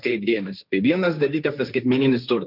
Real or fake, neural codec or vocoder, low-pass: fake; codec, 16 kHz, 1.1 kbps, Voila-Tokenizer; 5.4 kHz